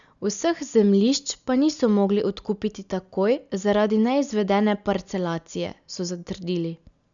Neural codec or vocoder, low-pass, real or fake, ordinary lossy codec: none; 7.2 kHz; real; none